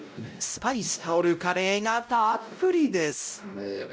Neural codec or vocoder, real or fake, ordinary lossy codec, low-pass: codec, 16 kHz, 0.5 kbps, X-Codec, WavLM features, trained on Multilingual LibriSpeech; fake; none; none